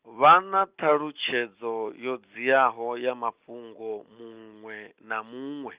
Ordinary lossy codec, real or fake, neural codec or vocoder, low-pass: Opus, 32 kbps; real; none; 3.6 kHz